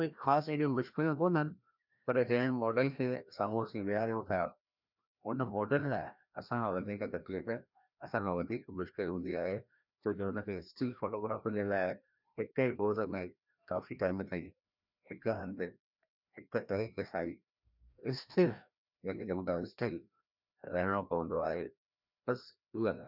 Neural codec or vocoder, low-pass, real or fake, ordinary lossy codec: codec, 16 kHz, 1 kbps, FreqCodec, larger model; 5.4 kHz; fake; none